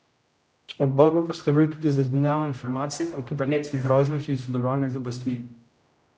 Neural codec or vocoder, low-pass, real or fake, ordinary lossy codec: codec, 16 kHz, 0.5 kbps, X-Codec, HuBERT features, trained on general audio; none; fake; none